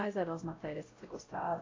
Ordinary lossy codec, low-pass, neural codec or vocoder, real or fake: AAC, 32 kbps; 7.2 kHz; codec, 16 kHz, 0.5 kbps, X-Codec, WavLM features, trained on Multilingual LibriSpeech; fake